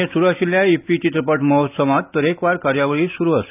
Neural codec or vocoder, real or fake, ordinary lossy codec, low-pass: none; real; none; 3.6 kHz